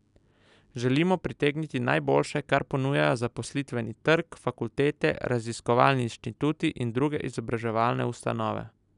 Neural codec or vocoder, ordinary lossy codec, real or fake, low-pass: none; none; real; 10.8 kHz